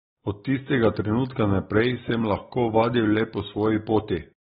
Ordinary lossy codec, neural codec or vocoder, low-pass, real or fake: AAC, 16 kbps; none; 7.2 kHz; real